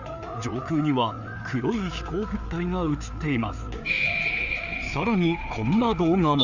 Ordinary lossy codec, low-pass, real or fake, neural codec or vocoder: none; 7.2 kHz; fake; codec, 16 kHz, 4 kbps, FreqCodec, larger model